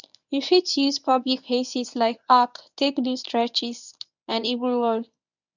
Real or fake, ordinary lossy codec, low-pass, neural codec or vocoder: fake; none; 7.2 kHz; codec, 24 kHz, 0.9 kbps, WavTokenizer, medium speech release version 1